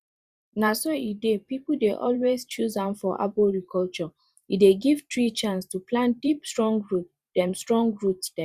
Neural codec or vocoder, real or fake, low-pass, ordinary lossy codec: vocoder, 44.1 kHz, 128 mel bands every 512 samples, BigVGAN v2; fake; 14.4 kHz; Opus, 64 kbps